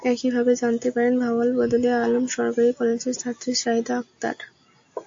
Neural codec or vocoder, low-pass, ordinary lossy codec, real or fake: none; 7.2 kHz; AAC, 48 kbps; real